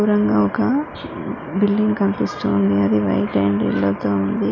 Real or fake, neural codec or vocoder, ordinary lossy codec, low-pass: real; none; none; 7.2 kHz